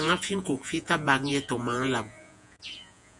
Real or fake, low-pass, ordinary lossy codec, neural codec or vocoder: fake; 10.8 kHz; MP3, 96 kbps; vocoder, 48 kHz, 128 mel bands, Vocos